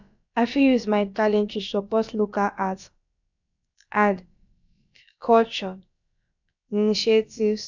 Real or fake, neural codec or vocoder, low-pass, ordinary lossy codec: fake; codec, 16 kHz, about 1 kbps, DyCAST, with the encoder's durations; 7.2 kHz; none